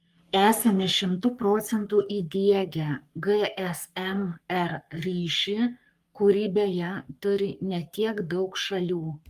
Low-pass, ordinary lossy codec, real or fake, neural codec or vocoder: 14.4 kHz; Opus, 32 kbps; fake; codec, 44.1 kHz, 3.4 kbps, Pupu-Codec